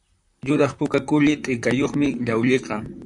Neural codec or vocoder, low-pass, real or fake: vocoder, 44.1 kHz, 128 mel bands, Pupu-Vocoder; 10.8 kHz; fake